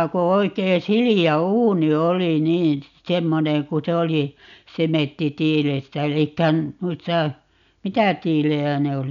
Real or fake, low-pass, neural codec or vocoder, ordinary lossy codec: real; 7.2 kHz; none; none